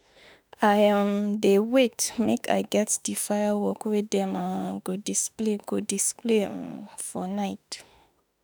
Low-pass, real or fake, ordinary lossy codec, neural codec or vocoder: none; fake; none; autoencoder, 48 kHz, 32 numbers a frame, DAC-VAE, trained on Japanese speech